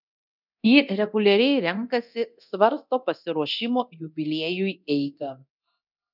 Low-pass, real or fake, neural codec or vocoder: 5.4 kHz; fake; codec, 24 kHz, 0.9 kbps, DualCodec